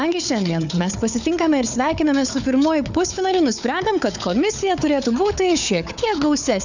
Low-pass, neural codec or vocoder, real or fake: 7.2 kHz; codec, 16 kHz, 4 kbps, FunCodec, trained on Chinese and English, 50 frames a second; fake